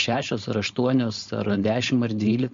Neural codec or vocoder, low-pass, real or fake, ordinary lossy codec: codec, 16 kHz, 4.8 kbps, FACodec; 7.2 kHz; fake; MP3, 48 kbps